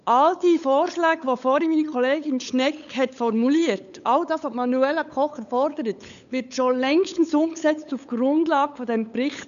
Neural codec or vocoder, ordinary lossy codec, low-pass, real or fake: codec, 16 kHz, 8 kbps, FunCodec, trained on LibriTTS, 25 frames a second; none; 7.2 kHz; fake